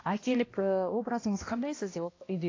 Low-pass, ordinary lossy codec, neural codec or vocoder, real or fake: 7.2 kHz; AAC, 32 kbps; codec, 16 kHz, 1 kbps, X-Codec, HuBERT features, trained on balanced general audio; fake